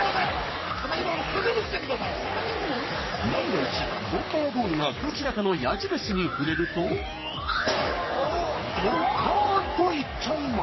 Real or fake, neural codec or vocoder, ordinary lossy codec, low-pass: fake; codec, 44.1 kHz, 3.4 kbps, Pupu-Codec; MP3, 24 kbps; 7.2 kHz